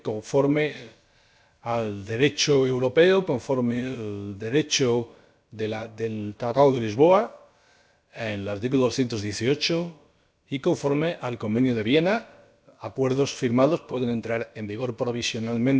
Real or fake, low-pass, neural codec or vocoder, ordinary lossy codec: fake; none; codec, 16 kHz, about 1 kbps, DyCAST, with the encoder's durations; none